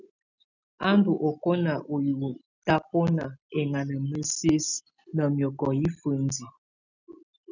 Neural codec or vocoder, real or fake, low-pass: none; real; 7.2 kHz